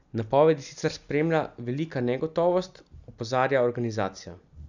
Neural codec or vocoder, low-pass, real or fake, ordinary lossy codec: none; 7.2 kHz; real; none